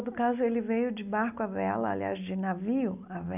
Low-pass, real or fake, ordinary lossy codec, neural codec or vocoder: 3.6 kHz; real; none; none